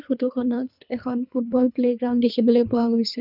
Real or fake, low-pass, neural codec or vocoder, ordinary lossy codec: fake; 5.4 kHz; codec, 24 kHz, 3 kbps, HILCodec; none